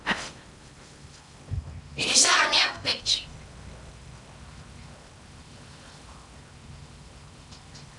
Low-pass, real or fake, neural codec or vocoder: 10.8 kHz; fake; codec, 16 kHz in and 24 kHz out, 0.6 kbps, FocalCodec, streaming, 4096 codes